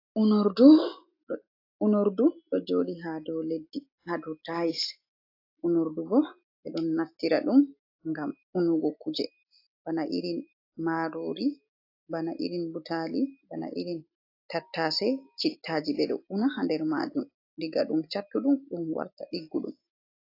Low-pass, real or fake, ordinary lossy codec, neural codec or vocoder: 5.4 kHz; real; AAC, 32 kbps; none